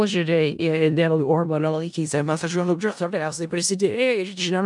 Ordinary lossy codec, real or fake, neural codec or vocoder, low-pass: MP3, 96 kbps; fake; codec, 16 kHz in and 24 kHz out, 0.4 kbps, LongCat-Audio-Codec, four codebook decoder; 10.8 kHz